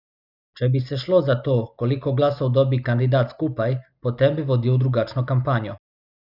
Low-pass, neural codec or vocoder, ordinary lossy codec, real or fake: 5.4 kHz; none; none; real